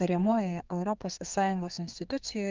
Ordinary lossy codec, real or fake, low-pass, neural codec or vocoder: Opus, 24 kbps; fake; 7.2 kHz; codec, 32 kHz, 1.9 kbps, SNAC